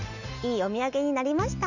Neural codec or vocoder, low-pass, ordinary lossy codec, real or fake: none; 7.2 kHz; none; real